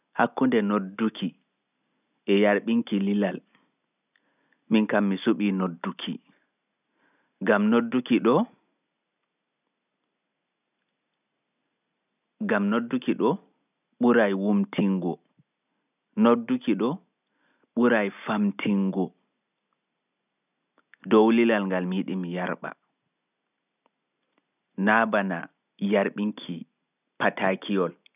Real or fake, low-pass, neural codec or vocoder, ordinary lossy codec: real; 3.6 kHz; none; none